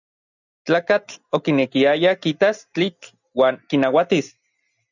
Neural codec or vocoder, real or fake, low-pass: none; real; 7.2 kHz